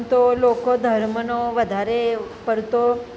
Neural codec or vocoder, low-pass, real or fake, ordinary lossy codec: none; none; real; none